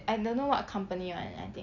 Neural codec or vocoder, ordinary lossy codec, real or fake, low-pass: none; none; real; 7.2 kHz